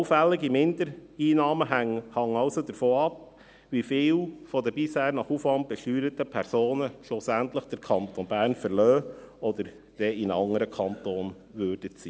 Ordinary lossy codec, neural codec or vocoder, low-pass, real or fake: none; none; none; real